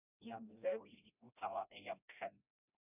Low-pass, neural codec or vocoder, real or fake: 3.6 kHz; codec, 16 kHz, 1 kbps, FreqCodec, smaller model; fake